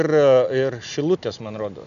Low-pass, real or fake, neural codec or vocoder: 7.2 kHz; real; none